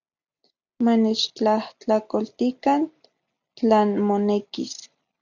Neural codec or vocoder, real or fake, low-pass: none; real; 7.2 kHz